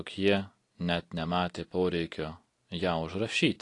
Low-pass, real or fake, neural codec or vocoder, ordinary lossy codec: 10.8 kHz; real; none; AAC, 48 kbps